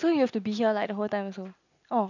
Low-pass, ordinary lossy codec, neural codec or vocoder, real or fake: 7.2 kHz; none; none; real